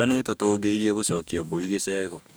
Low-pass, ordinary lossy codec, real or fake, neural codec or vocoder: none; none; fake; codec, 44.1 kHz, 2.6 kbps, DAC